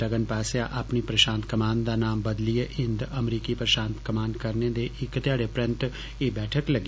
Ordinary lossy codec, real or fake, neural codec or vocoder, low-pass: none; real; none; none